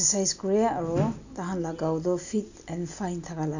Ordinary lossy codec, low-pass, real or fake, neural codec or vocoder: none; 7.2 kHz; real; none